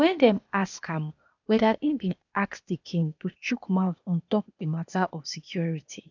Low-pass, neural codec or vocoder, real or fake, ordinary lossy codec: 7.2 kHz; codec, 16 kHz, 0.8 kbps, ZipCodec; fake; none